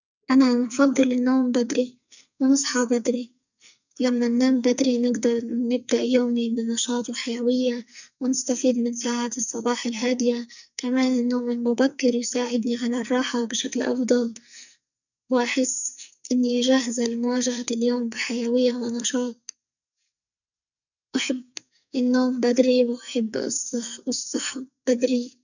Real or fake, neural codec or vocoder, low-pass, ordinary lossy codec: fake; codec, 44.1 kHz, 2.6 kbps, SNAC; 7.2 kHz; none